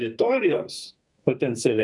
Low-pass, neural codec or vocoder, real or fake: 10.8 kHz; codec, 32 kHz, 1.9 kbps, SNAC; fake